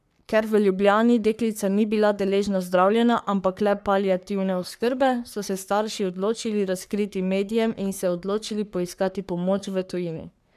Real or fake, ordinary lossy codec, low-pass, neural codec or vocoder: fake; none; 14.4 kHz; codec, 44.1 kHz, 3.4 kbps, Pupu-Codec